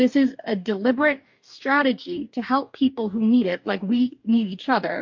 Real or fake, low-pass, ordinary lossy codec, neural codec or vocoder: fake; 7.2 kHz; MP3, 48 kbps; codec, 44.1 kHz, 2.6 kbps, DAC